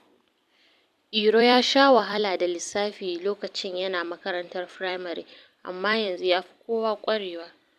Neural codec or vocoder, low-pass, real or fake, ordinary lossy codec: vocoder, 44.1 kHz, 128 mel bands every 256 samples, BigVGAN v2; 14.4 kHz; fake; none